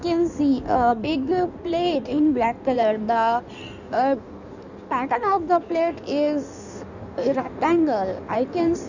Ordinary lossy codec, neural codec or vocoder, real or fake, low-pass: none; codec, 16 kHz in and 24 kHz out, 1.1 kbps, FireRedTTS-2 codec; fake; 7.2 kHz